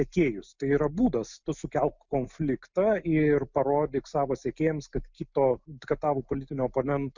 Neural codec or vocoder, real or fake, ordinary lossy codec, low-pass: none; real; Opus, 64 kbps; 7.2 kHz